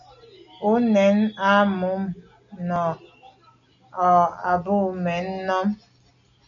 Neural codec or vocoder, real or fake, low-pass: none; real; 7.2 kHz